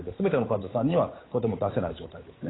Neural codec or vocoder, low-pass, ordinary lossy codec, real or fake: codec, 16 kHz, 8 kbps, FunCodec, trained on Chinese and English, 25 frames a second; 7.2 kHz; AAC, 16 kbps; fake